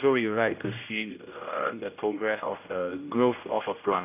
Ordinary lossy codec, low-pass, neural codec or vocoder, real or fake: none; 3.6 kHz; codec, 16 kHz, 0.5 kbps, X-Codec, HuBERT features, trained on general audio; fake